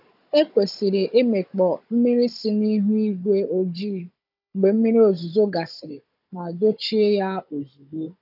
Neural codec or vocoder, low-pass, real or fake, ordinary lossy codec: codec, 16 kHz, 16 kbps, FunCodec, trained on Chinese and English, 50 frames a second; 5.4 kHz; fake; AAC, 48 kbps